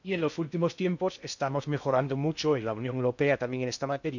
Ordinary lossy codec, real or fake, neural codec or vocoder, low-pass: none; fake; codec, 16 kHz in and 24 kHz out, 0.6 kbps, FocalCodec, streaming, 2048 codes; 7.2 kHz